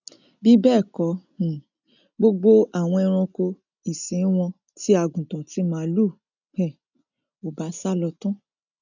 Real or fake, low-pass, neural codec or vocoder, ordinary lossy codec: real; 7.2 kHz; none; none